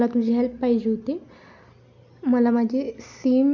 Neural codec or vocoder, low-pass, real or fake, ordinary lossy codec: none; 7.2 kHz; real; none